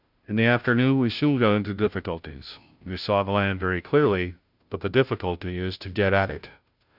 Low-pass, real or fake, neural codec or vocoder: 5.4 kHz; fake; codec, 16 kHz, 0.5 kbps, FunCodec, trained on Chinese and English, 25 frames a second